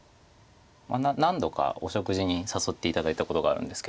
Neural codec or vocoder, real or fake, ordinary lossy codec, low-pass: none; real; none; none